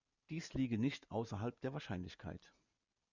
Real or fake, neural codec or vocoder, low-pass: real; none; 7.2 kHz